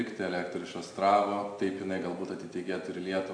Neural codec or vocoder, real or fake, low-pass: none; real; 9.9 kHz